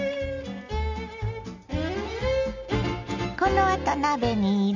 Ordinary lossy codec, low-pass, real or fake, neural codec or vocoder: none; 7.2 kHz; real; none